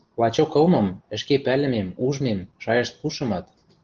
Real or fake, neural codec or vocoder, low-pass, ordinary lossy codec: real; none; 7.2 kHz; Opus, 16 kbps